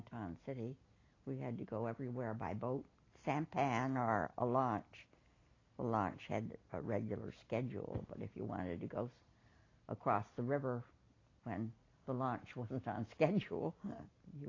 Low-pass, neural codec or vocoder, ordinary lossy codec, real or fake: 7.2 kHz; none; AAC, 32 kbps; real